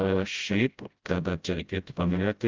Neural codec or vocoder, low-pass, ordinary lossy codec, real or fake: codec, 16 kHz, 0.5 kbps, FreqCodec, smaller model; 7.2 kHz; Opus, 32 kbps; fake